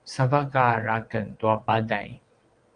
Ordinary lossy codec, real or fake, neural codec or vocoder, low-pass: Opus, 32 kbps; fake; vocoder, 22.05 kHz, 80 mel bands, WaveNeXt; 9.9 kHz